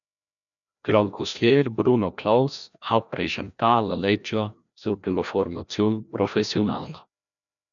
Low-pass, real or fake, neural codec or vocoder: 7.2 kHz; fake; codec, 16 kHz, 1 kbps, FreqCodec, larger model